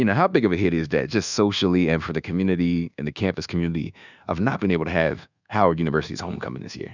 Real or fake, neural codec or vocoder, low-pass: fake; codec, 24 kHz, 1.2 kbps, DualCodec; 7.2 kHz